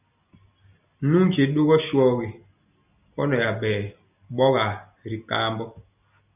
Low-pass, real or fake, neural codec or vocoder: 3.6 kHz; real; none